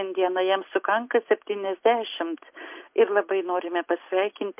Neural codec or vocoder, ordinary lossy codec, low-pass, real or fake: none; MP3, 32 kbps; 3.6 kHz; real